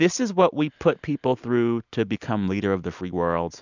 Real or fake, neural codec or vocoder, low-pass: real; none; 7.2 kHz